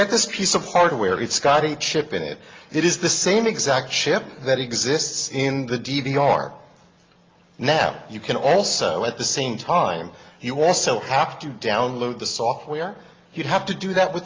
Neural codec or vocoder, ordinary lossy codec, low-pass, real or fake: none; Opus, 32 kbps; 7.2 kHz; real